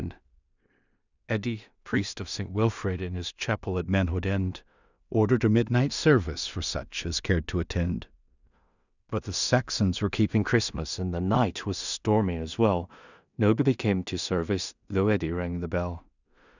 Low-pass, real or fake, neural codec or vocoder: 7.2 kHz; fake; codec, 16 kHz in and 24 kHz out, 0.4 kbps, LongCat-Audio-Codec, two codebook decoder